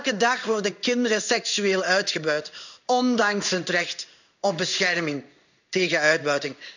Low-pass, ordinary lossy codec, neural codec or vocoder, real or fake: 7.2 kHz; none; codec, 16 kHz in and 24 kHz out, 1 kbps, XY-Tokenizer; fake